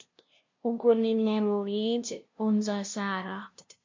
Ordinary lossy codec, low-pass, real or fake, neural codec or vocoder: MP3, 48 kbps; 7.2 kHz; fake; codec, 16 kHz, 0.5 kbps, FunCodec, trained on LibriTTS, 25 frames a second